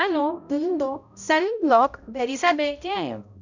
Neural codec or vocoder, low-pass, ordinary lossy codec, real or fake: codec, 16 kHz, 0.5 kbps, X-Codec, HuBERT features, trained on balanced general audio; 7.2 kHz; none; fake